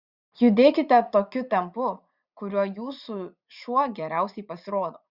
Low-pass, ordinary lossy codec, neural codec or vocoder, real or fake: 5.4 kHz; Opus, 64 kbps; vocoder, 44.1 kHz, 80 mel bands, Vocos; fake